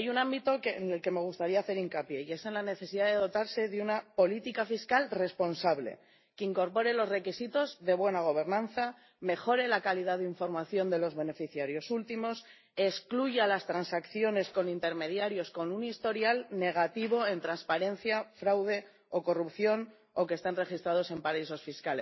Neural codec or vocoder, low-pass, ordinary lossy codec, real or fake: none; 7.2 kHz; MP3, 24 kbps; real